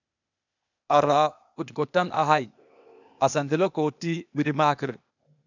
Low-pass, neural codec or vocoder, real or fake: 7.2 kHz; codec, 16 kHz, 0.8 kbps, ZipCodec; fake